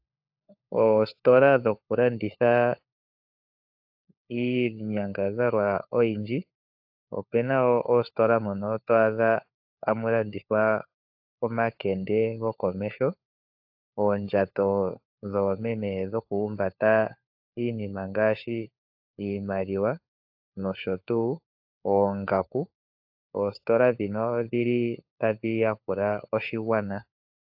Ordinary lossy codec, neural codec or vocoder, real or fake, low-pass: AAC, 48 kbps; codec, 16 kHz, 4 kbps, FunCodec, trained on LibriTTS, 50 frames a second; fake; 5.4 kHz